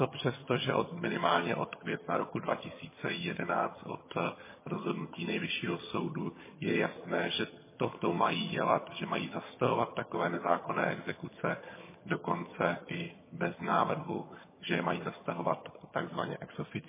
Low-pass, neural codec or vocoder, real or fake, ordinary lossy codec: 3.6 kHz; vocoder, 22.05 kHz, 80 mel bands, HiFi-GAN; fake; MP3, 16 kbps